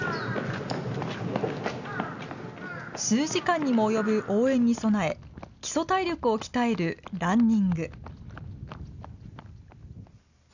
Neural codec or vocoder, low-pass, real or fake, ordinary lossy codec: none; 7.2 kHz; real; none